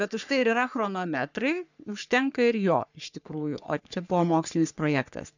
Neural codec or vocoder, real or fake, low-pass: codec, 44.1 kHz, 3.4 kbps, Pupu-Codec; fake; 7.2 kHz